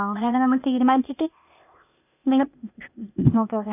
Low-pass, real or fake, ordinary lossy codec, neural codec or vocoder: 3.6 kHz; fake; none; codec, 16 kHz, 0.8 kbps, ZipCodec